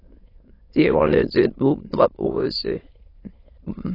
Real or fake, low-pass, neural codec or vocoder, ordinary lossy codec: fake; 5.4 kHz; autoencoder, 22.05 kHz, a latent of 192 numbers a frame, VITS, trained on many speakers; AAC, 24 kbps